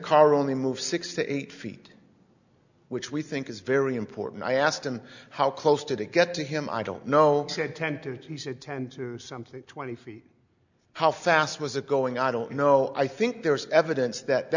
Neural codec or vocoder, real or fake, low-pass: none; real; 7.2 kHz